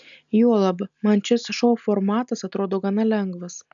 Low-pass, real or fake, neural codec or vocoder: 7.2 kHz; real; none